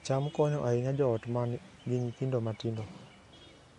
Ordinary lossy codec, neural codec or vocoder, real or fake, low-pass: MP3, 48 kbps; none; real; 14.4 kHz